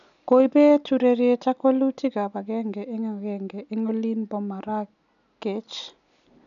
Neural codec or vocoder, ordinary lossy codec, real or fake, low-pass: none; none; real; 7.2 kHz